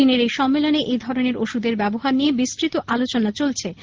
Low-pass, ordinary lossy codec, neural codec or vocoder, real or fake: 7.2 kHz; Opus, 16 kbps; none; real